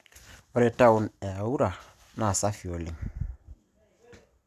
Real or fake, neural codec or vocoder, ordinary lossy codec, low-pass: real; none; none; 14.4 kHz